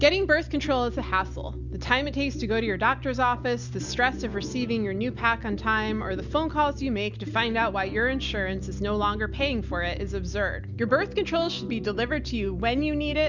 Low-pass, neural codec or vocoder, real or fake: 7.2 kHz; none; real